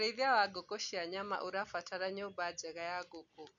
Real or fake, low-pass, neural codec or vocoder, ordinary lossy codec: real; 7.2 kHz; none; none